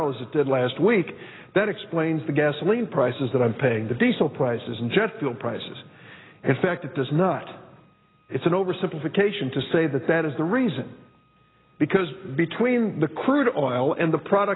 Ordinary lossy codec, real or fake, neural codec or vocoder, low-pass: AAC, 16 kbps; real; none; 7.2 kHz